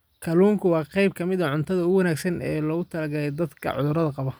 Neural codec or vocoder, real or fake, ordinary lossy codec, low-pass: none; real; none; none